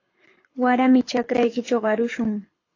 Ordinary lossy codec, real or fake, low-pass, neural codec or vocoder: AAC, 32 kbps; fake; 7.2 kHz; codec, 24 kHz, 6 kbps, HILCodec